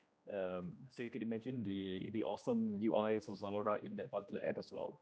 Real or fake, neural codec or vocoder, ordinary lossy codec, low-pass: fake; codec, 16 kHz, 1 kbps, X-Codec, HuBERT features, trained on general audio; none; none